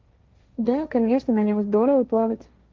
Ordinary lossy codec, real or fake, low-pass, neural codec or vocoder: Opus, 32 kbps; fake; 7.2 kHz; codec, 16 kHz, 1.1 kbps, Voila-Tokenizer